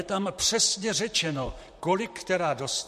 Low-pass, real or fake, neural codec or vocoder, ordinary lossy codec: 14.4 kHz; fake; vocoder, 44.1 kHz, 128 mel bands, Pupu-Vocoder; MP3, 64 kbps